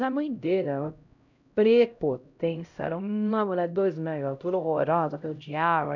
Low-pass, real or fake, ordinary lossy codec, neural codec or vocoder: 7.2 kHz; fake; none; codec, 16 kHz, 0.5 kbps, X-Codec, HuBERT features, trained on LibriSpeech